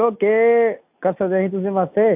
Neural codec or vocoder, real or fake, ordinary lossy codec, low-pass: none; real; AAC, 32 kbps; 3.6 kHz